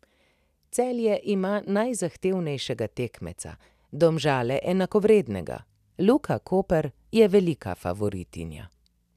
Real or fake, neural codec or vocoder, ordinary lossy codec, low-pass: real; none; none; 14.4 kHz